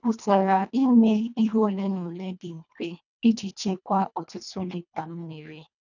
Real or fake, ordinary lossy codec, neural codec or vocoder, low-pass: fake; none; codec, 24 kHz, 1.5 kbps, HILCodec; 7.2 kHz